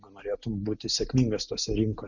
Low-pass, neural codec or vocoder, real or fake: 7.2 kHz; none; real